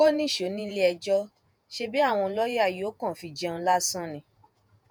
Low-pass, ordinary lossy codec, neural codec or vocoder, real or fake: none; none; vocoder, 48 kHz, 128 mel bands, Vocos; fake